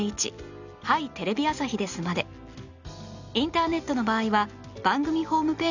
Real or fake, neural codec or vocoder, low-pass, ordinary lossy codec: real; none; 7.2 kHz; none